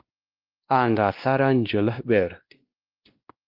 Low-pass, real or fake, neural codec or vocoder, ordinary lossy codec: 5.4 kHz; fake; codec, 16 kHz, 1 kbps, X-Codec, WavLM features, trained on Multilingual LibriSpeech; Opus, 32 kbps